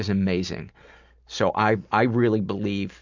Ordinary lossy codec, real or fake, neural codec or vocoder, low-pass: MP3, 64 kbps; real; none; 7.2 kHz